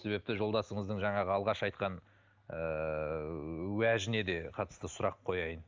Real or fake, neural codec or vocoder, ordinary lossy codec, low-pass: real; none; Opus, 32 kbps; 7.2 kHz